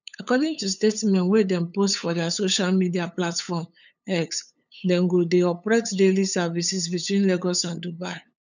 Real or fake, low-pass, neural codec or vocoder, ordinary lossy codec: fake; 7.2 kHz; codec, 16 kHz, 8 kbps, FunCodec, trained on LibriTTS, 25 frames a second; none